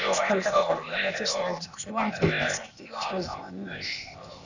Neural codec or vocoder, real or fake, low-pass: codec, 16 kHz, 0.8 kbps, ZipCodec; fake; 7.2 kHz